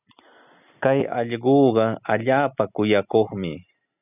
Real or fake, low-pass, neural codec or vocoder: real; 3.6 kHz; none